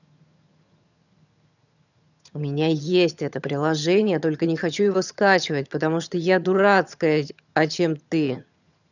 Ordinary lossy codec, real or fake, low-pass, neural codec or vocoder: none; fake; 7.2 kHz; vocoder, 22.05 kHz, 80 mel bands, HiFi-GAN